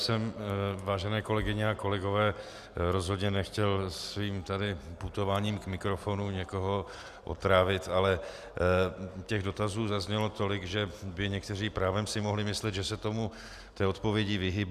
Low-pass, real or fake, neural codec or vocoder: 14.4 kHz; real; none